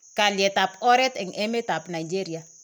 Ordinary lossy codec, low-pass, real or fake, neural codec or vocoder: none; none; real; none